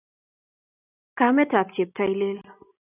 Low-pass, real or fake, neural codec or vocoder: 3.6 kHz; real; none